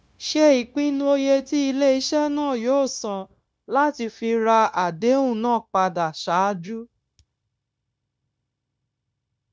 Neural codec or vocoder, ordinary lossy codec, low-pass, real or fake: codec, 16 kHz, 0.9 kbps, LongCat-Audio-Codec; none; none; fake